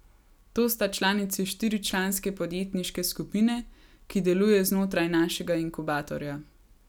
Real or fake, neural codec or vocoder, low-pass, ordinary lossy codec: real; none; none; none